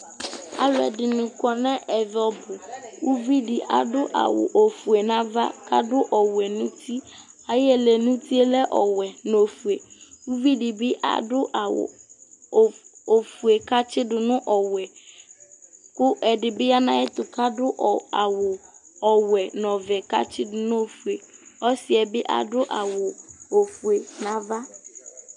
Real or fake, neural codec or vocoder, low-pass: real; none; 10.8 kHz